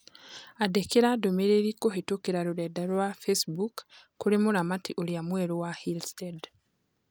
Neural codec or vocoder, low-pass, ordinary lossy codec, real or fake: none; none; none; real